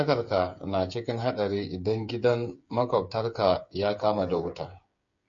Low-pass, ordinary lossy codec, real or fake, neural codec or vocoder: 7.2 kHz; MP3, 48 kbps; fake; codec, 16 kHz, 8 kbps, FreqCodec, smaller model